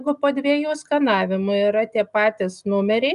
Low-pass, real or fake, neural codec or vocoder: 10.8 kHz; real; none